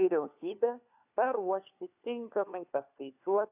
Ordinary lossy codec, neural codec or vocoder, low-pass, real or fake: AAC, 32 kbps; codec, 16 kHz, 4 kbps, FunCodec, trained on LibriTTS, 50 frames a second; 3.6 kHz; fake